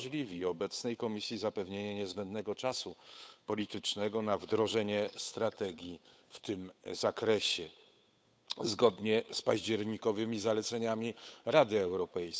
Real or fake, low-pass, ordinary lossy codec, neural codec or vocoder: fake; none; none; codec, 16 kHz, 8 kbps, FunCodec, trained on Chinese and English, 25 frames a second